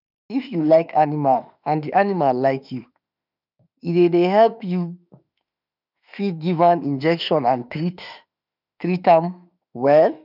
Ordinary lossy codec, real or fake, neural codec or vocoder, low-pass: none; fake; autoencoder, 48 kHz, 32 numbers a frame, DAC-VAE, trained on Japanese speech; 5.4 kHz